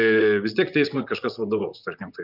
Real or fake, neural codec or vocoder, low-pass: fake; vocoder, 44.1 kHz, 128 mel bands, Pupu-Vocoder; 5.4 kHz